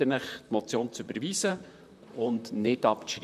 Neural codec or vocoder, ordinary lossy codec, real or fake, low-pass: vocoder, 44.1 kHz, 128 mel bands, Pupu-Vocoder; none; fake; 14.4 kHz